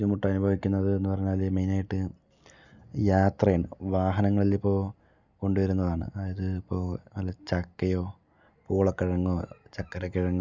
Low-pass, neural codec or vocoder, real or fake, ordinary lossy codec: 7.2 kHz; none; real; none